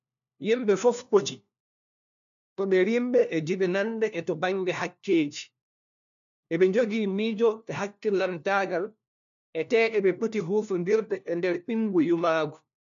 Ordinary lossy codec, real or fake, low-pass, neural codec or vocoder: none; fake; 7.2 kHz; codec, 16 kHz, 1 kbps, FunCodec, trained on LibriTTS, 50 frames a second